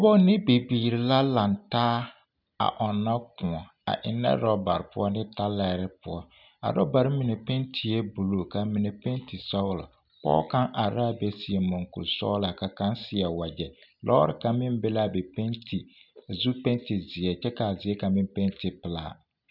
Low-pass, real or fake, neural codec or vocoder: 5.4 kHz; real; none